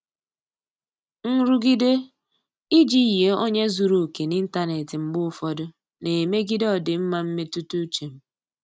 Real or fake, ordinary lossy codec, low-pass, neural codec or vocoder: real; none; none; none